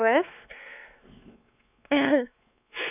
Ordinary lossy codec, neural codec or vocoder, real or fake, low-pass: none; codec, 16 kHz, 2 kbps, X-Codec, WavLM features, trained on Multilingual LibriSpeech; fake; 3.6 kHz